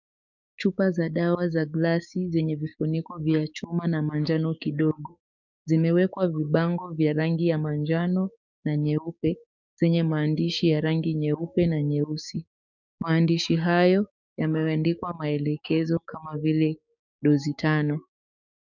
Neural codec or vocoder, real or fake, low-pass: codec, 16 kHz, 6 kbps, DAC; fake; 7.2 kHz